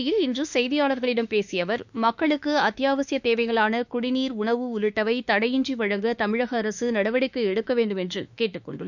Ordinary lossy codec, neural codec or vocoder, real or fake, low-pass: none; autoencoder, 48 kHz, 32 numbers a frame, DAC-VAE, trained on Japanese speech; fake; 7.2 kHz